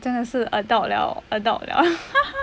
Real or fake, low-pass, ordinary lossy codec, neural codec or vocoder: real; none; none; none